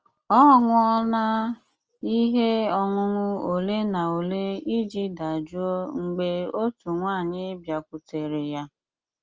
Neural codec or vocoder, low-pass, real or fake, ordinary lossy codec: none; 7.2 kHz; real; Opus, 24 kbps